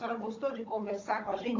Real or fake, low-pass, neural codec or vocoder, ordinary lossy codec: fake; 7.2 kHz; codec, 16 kHz, 16 kbps, FunCodec, trained on Chinese and English, 50 frames a second; AAC, 32 kbps